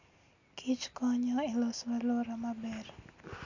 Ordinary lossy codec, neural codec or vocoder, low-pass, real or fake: none; none; 7.2 kHz; real